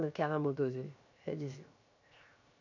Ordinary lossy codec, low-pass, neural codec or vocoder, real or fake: none; 7.2 kHz; codec, 16 kHz, 0.7 kbps, FocalCodec; fake